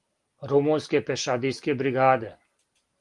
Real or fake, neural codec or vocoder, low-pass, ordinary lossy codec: real; none; 10.8 kHz; Opus, 24 kbps